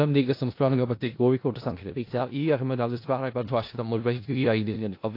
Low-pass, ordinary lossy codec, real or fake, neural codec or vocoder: 5.4 kHz; AAC, 32 kbps; fake; codec, 16 kHz in and 24 kHz out, 0.4 kbps, LongCat-Audio-Codec, four codebook decoder